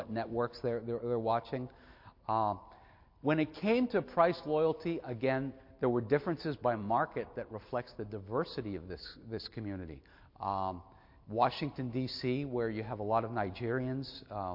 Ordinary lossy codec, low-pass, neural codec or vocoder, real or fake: MP3, 32 kbps; 5.4 kHz; none; real